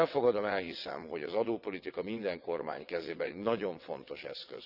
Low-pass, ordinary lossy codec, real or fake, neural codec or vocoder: 5.4 kHz; none; fake; vocoder, 22.05 kHz, 80 mel bands, WaveNeXt